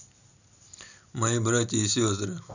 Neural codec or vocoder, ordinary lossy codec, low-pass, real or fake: none; none; 7.2 kHz; real